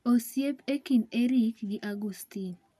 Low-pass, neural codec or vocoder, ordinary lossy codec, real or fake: 14.4 kHz; none; none; real